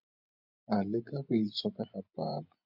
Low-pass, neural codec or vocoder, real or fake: 5.4 kHz; vocoder, 24 kHz, 100 mel bands, Vocos; fake